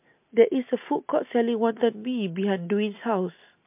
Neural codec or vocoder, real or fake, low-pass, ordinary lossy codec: none; real; 3.6 kHz; MP3, 32 kbps